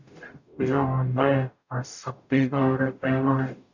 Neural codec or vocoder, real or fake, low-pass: codec, 44.1 kHz, 0.9 kbps, DAC; fake; 7.2 kHz